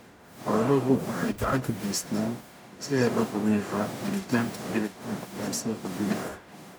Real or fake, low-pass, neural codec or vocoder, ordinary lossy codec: fake; none; codec, 44.1 kHz, 0.9 kbps, DAC; none